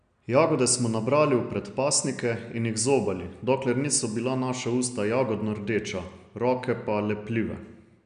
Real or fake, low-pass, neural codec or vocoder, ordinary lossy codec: real; 9.9 kHz; none; MP3, 96 kbps